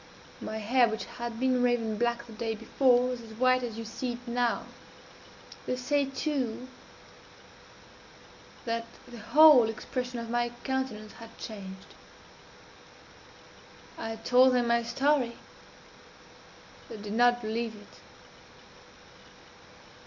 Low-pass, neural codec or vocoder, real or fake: 7.2 kHz; none; real